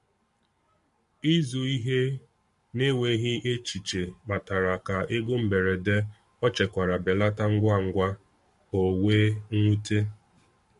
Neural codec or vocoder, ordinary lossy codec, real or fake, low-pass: codec, 44.1 kHz, 7.8 kbps, Pupu-Codec; MP3, 48 kbps; fake; 14.4 kHz